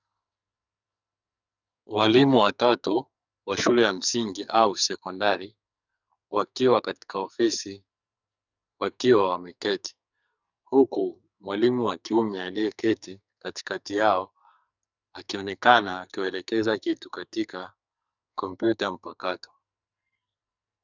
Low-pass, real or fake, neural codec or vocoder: 7.2 kHz; fake; codec, 44.1 kHz, 2.6 kbps, SNAC